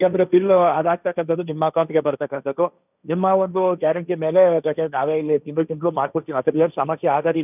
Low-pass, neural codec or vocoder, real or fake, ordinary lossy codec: 3.6 kHz; codec, 16 kHz, 1.1 kbps, Voila-Tokenizer; fake; none